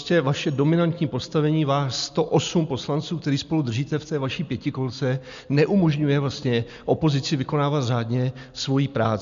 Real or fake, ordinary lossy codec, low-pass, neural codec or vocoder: real; MP3, 64 kbps; 7.2 kHz; none